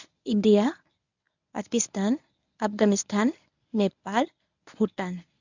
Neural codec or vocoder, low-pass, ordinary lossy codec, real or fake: codec, 24 kHz, 0.9 kbps, WavTokenizer, medium speech release version 1; 7.2 kHz; none; fake